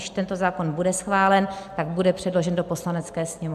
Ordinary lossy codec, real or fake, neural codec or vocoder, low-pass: AAC, 96 kbps; real; none; 14.4 kHz